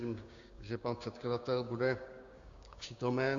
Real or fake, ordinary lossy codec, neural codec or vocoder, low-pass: fake; Opus, 64 kbps; codec, 16 kHz, 6 kbps, DAC; 7.2 kHz